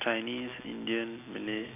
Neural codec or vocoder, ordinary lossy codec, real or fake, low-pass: none; AAC, 24 kbps; real; 3.6 kHz